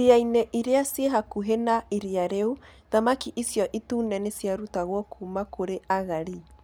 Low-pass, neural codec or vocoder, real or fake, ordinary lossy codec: none; none; real; none